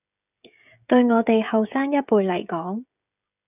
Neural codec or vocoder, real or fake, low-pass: codec, 16 kHz, 16 kbps, FreqCodec, smaller model; fake; 3.6 kHz